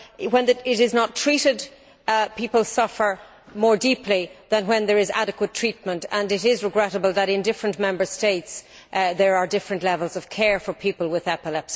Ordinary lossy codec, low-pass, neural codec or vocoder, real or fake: none; none; none; real